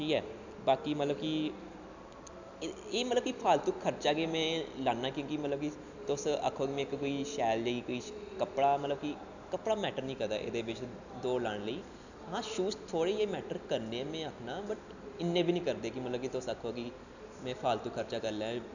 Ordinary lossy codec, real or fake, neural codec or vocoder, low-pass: none; real; none; 7.2 kHz